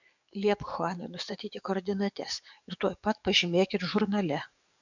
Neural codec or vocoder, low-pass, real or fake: codec, 44.1 kHz, 7.8 kbps, DAC; 7.2 kHz; fake